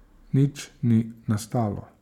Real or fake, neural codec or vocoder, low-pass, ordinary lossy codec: fake; vocoder, 44.1 kHz, 128 mel bands every 512 samples, BigVGAN v2; 19.8 kHz; none